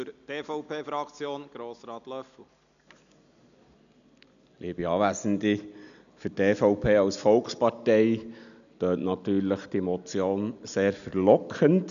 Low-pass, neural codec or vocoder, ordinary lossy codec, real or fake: 7.2 kHz; none; none; real